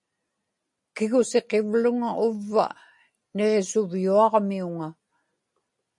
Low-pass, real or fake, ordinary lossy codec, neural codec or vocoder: 10.8 kHz; real; MP3, 48 kbps; none